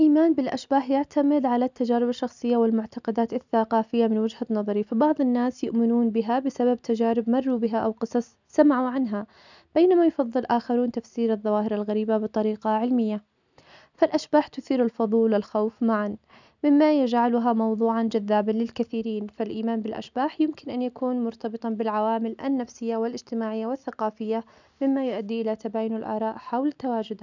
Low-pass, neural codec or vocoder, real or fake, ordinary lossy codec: 7.2 kHz; none; real; none